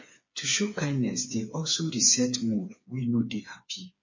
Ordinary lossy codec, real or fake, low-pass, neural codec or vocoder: MP3, 32 kbps; fake; 7.2 kHz; codec, 16 kHz, 4 kbps, FreqCodec, smaller model